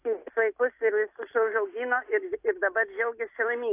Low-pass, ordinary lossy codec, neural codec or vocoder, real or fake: 3.6 kHz; AAC, 24 kbps; none; real